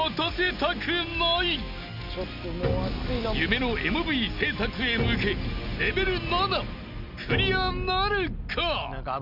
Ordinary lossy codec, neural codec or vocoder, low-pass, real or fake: none; none; 5.4 kHz; real